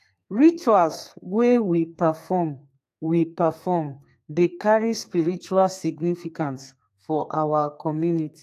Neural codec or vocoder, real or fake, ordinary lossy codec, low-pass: codec, 32 kHz, 1.9 kbps, SNAC; fake; AAC, 64 kbps; 14.4 kHz